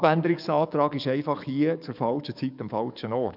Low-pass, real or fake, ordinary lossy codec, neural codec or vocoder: 5.4 kHz; fake; none; codec, 44.1 kHz, 7.8 kbps, DAC